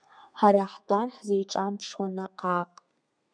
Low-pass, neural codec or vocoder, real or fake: 9.9 kHz; codec, 32 kHz, 1.9 kbps, SNAC; fake